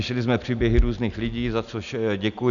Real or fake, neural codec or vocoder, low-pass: real; none; 7.2 kHz